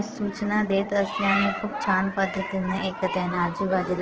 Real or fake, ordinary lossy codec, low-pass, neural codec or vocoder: fake; Opus, 16 kbps; 7.2 kHz; vocoder, 22.05 kHz, 80 mel bands, Vocos